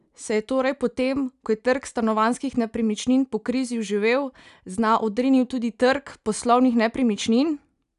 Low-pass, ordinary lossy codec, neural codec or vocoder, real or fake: 10.8 kHz; none; none; real